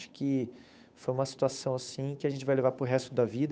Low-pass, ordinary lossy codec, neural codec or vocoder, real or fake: none; none; codec, 16 kHz, 8 kbps, FunCodec, trained on Chinese and English, 25 frames a second; fake